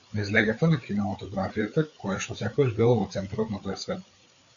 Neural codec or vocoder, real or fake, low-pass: codec, 16 kHz, 4 kbps, FreqCodec, larger model; fake; 7.2 kHz